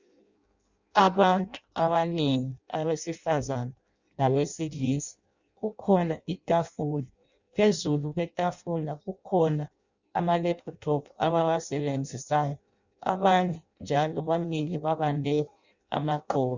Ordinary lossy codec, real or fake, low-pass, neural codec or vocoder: Opus, 64 kbps; fake; 7.2 kHz; codec, 16 kHz in and 24 kHz out, 0.6 kbps, FireRedTTS-2 codec